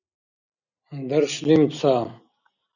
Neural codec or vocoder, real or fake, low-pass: none; real; 7.2 kHz